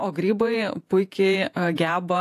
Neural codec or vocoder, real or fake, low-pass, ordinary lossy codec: vocoder, 48 kHz, 128 mel bands, Vocos; fake; 14.4 kHz; AAC, 64 kbps